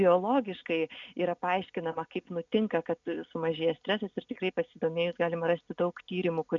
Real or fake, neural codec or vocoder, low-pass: real; none; 7.2 kHz